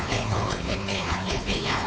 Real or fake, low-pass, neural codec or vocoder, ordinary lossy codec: fake; none; codec, 16 kHz, 4 kbps, X-Codec, WavLM features, trained on Multilingual LibriSpeech; none